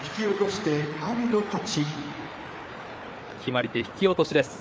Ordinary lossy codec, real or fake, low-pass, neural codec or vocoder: none; fake; none; codec, 16 kHz, 4 kbps, FreqCodec, larger model